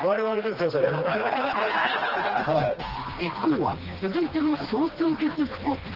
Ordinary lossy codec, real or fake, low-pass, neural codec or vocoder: Opus, 16 kbps; fake; 5.4 kHz; codec, 16 kHz, 2 kbps, FreqCodec, smaller model